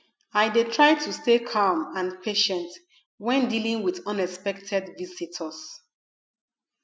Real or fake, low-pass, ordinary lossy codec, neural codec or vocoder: real; none; none; none